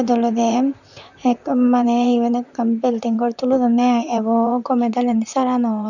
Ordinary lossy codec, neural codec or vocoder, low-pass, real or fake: none; vocoder, 44.1 kHz, 128 mel bands, Pupu-Vocoder; 7.2 kHz; fake